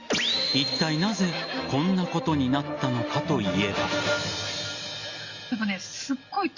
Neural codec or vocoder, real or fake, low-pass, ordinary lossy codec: none; real; 7.2 kHz; Opus, 64 kbps